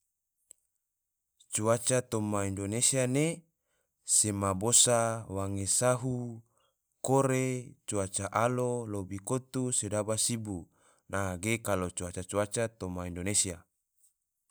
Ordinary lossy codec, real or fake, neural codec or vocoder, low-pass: none; real; none; none